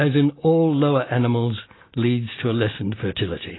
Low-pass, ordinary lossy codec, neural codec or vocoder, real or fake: 7.2 kHz; AAC, 16 kbps; none; real